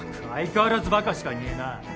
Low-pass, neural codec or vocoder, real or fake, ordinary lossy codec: none; none; real; none